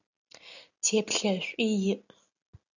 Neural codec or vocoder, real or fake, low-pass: none; real; 7.2 kHz